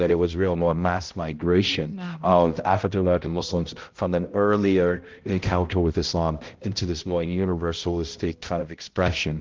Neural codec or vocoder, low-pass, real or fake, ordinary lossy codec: codec, 16 kHz, 0.5 kbps, X-Codec, HuBERT features, trained on balanced general audio; 7.2 kHz; fake; Opus, 16 kbps